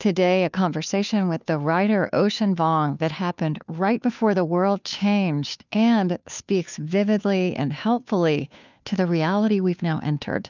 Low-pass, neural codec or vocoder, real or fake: 7.2 kHz; codec, 16 kHz, 2 kbps, FunCodec, trained on Chinese and English, 25 frames a second; fake